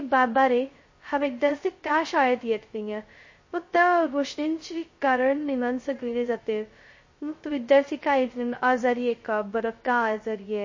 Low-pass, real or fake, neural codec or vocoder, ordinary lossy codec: 7.2 kHz; fake; codec, 16 kHz, 0.2 kbps, FocalCodec; MP3, 32 kbps